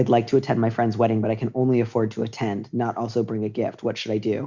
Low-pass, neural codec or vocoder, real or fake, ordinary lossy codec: 7.2 kHz; none; real; Opus, 64 kbps